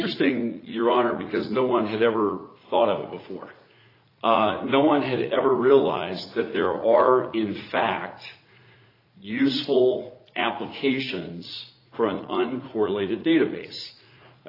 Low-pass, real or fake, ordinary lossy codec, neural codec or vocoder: 5.4 kHz; fake; AAC, 24 kbps; vocoder, 44.1 kHz, 80 mel bands, Vocos